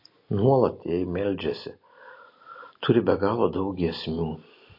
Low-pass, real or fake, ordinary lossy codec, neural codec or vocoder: 5.4 kHz; real; MP3, 32 kbps; none